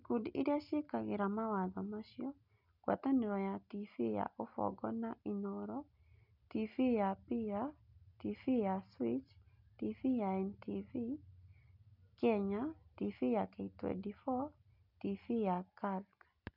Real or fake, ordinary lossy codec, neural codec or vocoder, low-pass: real; none; none; 5.4 kHz